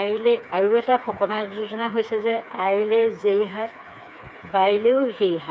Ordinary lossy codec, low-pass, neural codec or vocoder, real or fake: none; none; codec, 16 kHz, 4 kbps, FreqCodec, smaller model; fake